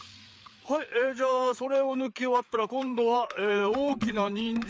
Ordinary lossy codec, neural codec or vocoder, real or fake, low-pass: none; codec, 16 kHz, 4 kbps, FreqCodec, larger model; fake; none